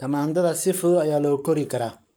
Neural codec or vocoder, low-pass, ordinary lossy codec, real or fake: codec, 44.1 kHz, 7.8 kbps, Pupu-Codec; none; none; fake